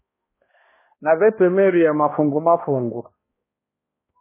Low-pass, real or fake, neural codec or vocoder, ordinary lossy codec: 3.6 kHz; fake; codec, 16 kHz, 1 kbps, X-Codec, HuBERT features, trained on balanced general audio; MP3, 16 kbps